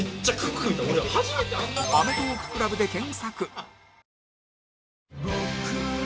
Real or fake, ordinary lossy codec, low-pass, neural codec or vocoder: real; none; none; none